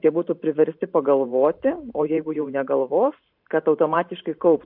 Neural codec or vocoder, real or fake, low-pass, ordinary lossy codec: vocoder, 44.1 kHz, 128 mel bands every 512 samples, BigVGAN v2; fake; 5.4 kHz; MP3, 48 kbps